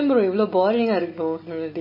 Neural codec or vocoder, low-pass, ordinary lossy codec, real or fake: none; 5.4 kHz; MP3, 24 kbps; real